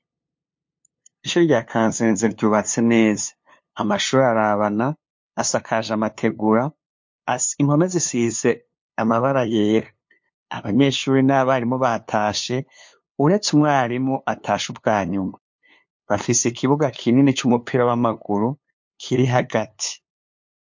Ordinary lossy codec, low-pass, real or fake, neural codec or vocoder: MP3, 48 kbps; 7.2 kHz; fake; codec, 16 kHz, 2 kbps, FunCodec, trained on LibriTTS, 25 frames a second